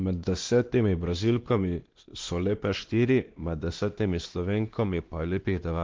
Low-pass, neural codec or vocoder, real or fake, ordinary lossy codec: 7.2 kHz; codec, 16 kHz, 2 kbps, X-Codec, WavLM features, trained on Multilingual LibriSpeech; fake; Opus, 16 kbps